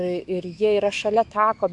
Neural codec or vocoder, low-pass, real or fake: autoencoder, 48 kHz, 128 numbers a frame, DAC-VAE, trained on Japanese speech; 10.8 kHz; fake